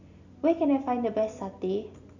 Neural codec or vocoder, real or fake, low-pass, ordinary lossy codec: none; real; 7.2 kHz; none